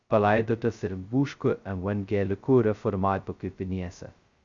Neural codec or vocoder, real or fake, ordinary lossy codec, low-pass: codec, 16 kHz, 0.2 kbps, FocalCodec; fake; Opus, 64 kbps; 7.2 kHz